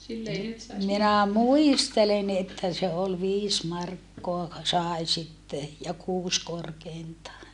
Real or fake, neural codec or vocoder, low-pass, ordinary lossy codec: real; none; 10.8 kHz; none